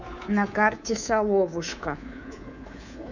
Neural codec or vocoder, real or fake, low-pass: codec, 24 kHz, 3.1 kbps, DualCodec; fake; 7.2 kHz